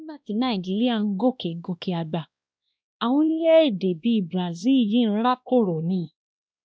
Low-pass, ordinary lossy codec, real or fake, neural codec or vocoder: none; none; fake; codec, 16 kHz, 1 kbps, X-Codec, WavLM features, trained on Multilingual LibriSpeech